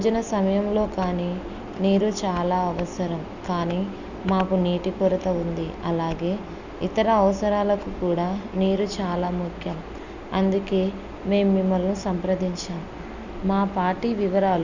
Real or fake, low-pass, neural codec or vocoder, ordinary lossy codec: real; 7.2 kHz; none; none